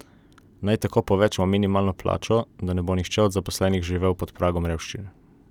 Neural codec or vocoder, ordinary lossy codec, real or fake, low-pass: none; none; real; 19.8 kHz